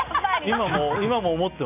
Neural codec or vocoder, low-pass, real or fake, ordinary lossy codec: none; 3.6 kHz; real; none